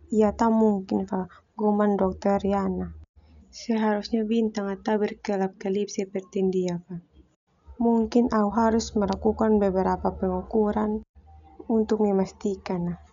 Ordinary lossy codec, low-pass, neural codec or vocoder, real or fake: none; 7.2 kHz; none; real